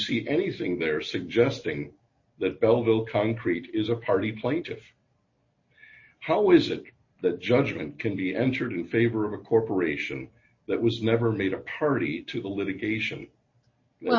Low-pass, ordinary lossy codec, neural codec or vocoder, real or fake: 7.2 kHz; MP3, 32 kbps; none; real